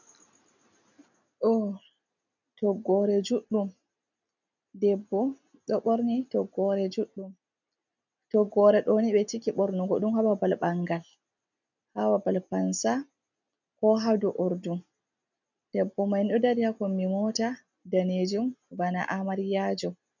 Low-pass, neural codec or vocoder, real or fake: 7.2 kHz; none; real